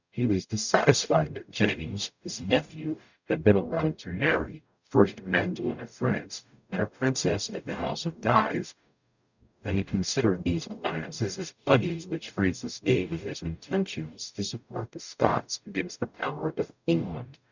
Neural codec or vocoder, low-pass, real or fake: codec, 44.1 kHz, 0.9 kbps, DAC; 7.2 kHz; fake